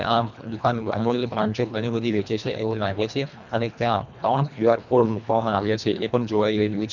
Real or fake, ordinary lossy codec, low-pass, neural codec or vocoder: fake; none; 7.2 kHz; codec, 24 kHz, 1.5 kbps, HILCodec